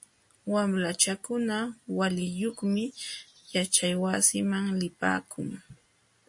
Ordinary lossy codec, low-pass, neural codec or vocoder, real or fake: MP3, 48 kbps; 10.8 kHz; none; real